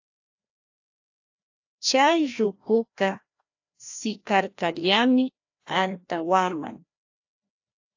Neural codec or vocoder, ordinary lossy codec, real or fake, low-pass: codec, 16 kHz, 1 kbps, FreqCodec, larger model; AAC, 48 kbps; fake; 7.2 kHz